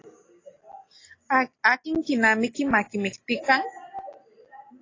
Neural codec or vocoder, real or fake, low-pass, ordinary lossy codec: none; real; 7.2 kHz; AAC, 32 kbps